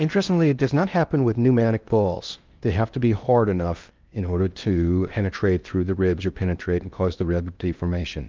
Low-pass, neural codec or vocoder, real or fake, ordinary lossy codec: 7.2 kHz; codec, 16 kHz in and 24 kHz out, 0.6 kbps, FocalCodec, streaming, 2048 codes; fake; Opus, 24 kbps